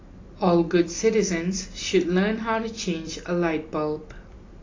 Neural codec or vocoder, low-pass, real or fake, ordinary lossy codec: none; 7.2 kHz; real; AAC, 32 kbps